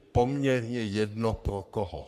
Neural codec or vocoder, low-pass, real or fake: codec, 44.1 kHz, 3.4 kbps, Pupu-Codec; 14.4 kHz; fake